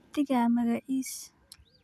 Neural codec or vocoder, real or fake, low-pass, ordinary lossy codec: none; real; 14.4 kHz; none